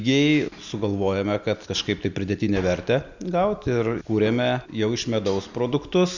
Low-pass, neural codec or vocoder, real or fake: 7.2 kHz; none; real